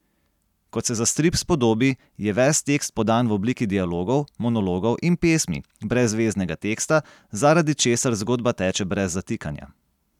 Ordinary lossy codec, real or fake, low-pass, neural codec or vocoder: none; real; 19.8 kHz; none